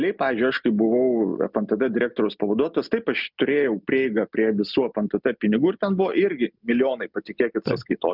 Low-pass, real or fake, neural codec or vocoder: 5.4 kHz; real; none